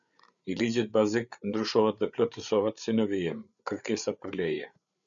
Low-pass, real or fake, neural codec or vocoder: 7.2 kHz; fake; codec, 16 kHz, 8 kbps, FreqCodec, larger model